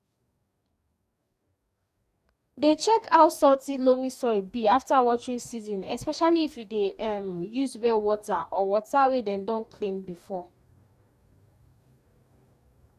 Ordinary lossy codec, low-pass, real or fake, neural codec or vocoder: none; 14.4 kHz; fake; codec, 44.1 kHz, 2.6 kbps, DAC